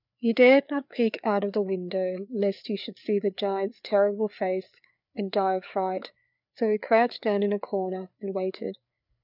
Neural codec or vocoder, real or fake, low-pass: codec, 16 kHz, 4 kbps, FreqCodec, larger model; fake; 5.4 kHz